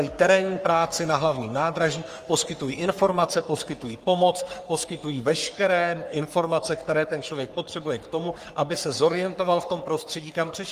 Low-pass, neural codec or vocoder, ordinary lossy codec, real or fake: 14.4 kHz; codec, 44.1 kHz, 3.4 kbps, Pupu-Codec; Opus, 32 kbps; fake